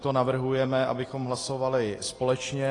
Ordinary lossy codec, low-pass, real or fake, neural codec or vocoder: AAC, 32 kbps; 10.8 kHz; real; none